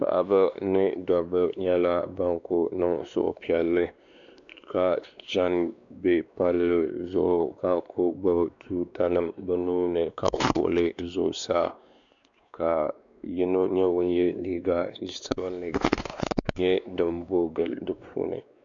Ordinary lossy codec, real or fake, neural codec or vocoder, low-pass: MP3, 96 kbps; fake; codec, 16 kHz, 2 kbps, X-Codec, WavLM features, trained on Multilingual LibriSpeech; 7.2 kHz